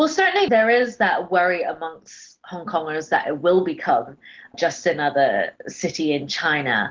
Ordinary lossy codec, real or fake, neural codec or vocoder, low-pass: Opus, 16 kbps; real; none; 7.2 kHz